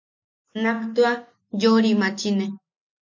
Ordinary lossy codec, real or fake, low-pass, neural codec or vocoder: MP3, 48 kbps; real; 7.2 kHz; none